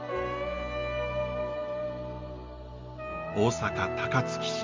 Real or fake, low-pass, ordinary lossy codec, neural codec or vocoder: real; 7.2 kHz; Opus, 32 kbps; none